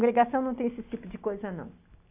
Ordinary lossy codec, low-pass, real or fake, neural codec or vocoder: none; 3.6 kHz; real; none